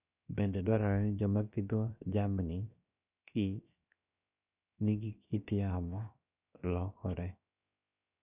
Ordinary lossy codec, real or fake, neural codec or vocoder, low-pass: none; fake; codec, 16 kHz, 0.7 kbps, FocalCodec; 3.6 kHz